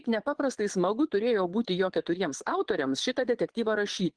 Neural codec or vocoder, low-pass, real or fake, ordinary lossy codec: vocoder, 22.05 kHz, 80 mel bands, Vocos; 9.9 kHz; fake; Opus, 16 kbps